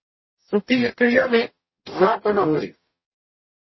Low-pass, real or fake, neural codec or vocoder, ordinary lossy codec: 7.2 kHz; fake; codec, 44.1 kHz, 0.9 kbps, DAC; MP3, 24 kbps